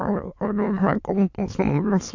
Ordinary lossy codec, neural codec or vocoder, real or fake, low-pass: AAC, 48 kbps; autoencoder, 22.05 kHz, a latent of 192 numbers a frame, VITS, trained on many speakers; fake; 7.2 kHz